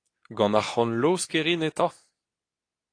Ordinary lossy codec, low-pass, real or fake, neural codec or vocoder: AAC, 48 kbps; 9.9 kHz; fake; codec, 24 kHz, 0.9 kbps, WavTokenizer, medium speech release version 2